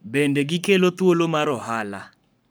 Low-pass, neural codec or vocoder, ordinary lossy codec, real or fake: none; codec, 44.1 kHz, 7.8 kbps, Pupu-Codec; none; fake